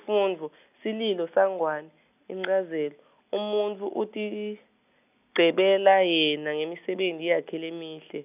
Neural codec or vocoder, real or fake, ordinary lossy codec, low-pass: none; real; none; 3.6 kHz